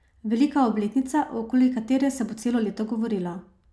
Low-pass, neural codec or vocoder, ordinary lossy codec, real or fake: none; none; none; real